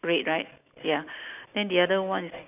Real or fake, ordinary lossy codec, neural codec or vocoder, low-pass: real; none; none; 3.6 kHz